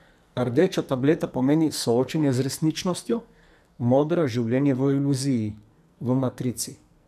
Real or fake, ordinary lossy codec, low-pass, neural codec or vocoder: fake; none; 14.4 kHz; codec, 44.1 kHz, 2.6 kbps, SNAC